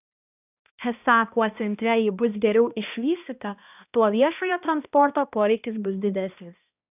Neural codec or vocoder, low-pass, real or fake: codec, 16 kHz, 1 kbps, X-Codec, HuBERT features, trained on balanced general audio; 3.6 kHz; fake